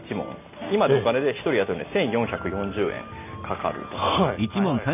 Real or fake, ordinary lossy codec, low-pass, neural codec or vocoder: real; none; 3.6 kHz; none